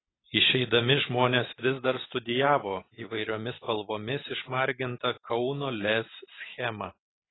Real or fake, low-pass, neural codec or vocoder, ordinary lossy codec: real; 7.2 kHz; none; AAC, 16 kbps